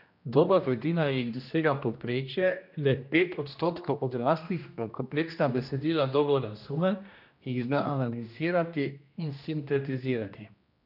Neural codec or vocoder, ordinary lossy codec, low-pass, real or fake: codec, 16 kHz, 1 kbps, X-Codec, HuBERT features, trained on general audio; none; 5.4 kHz; fake